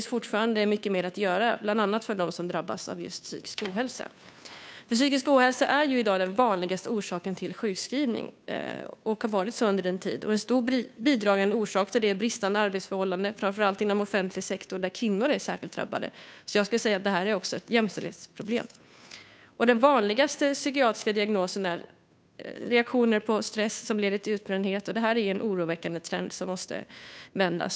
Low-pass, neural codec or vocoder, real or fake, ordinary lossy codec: none; codec, 16 kHz, 2 kbps, FunCodec, trained on Chinese and English, 25 frames a second; fake; none